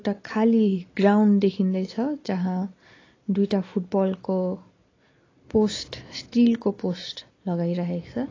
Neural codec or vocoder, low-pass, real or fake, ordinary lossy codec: none; 7.2 kHz; real; AAC, 32 kbps